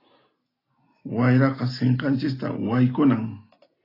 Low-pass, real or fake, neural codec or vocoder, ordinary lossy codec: 5.4 kHz; real; none; AAC, 24 kbps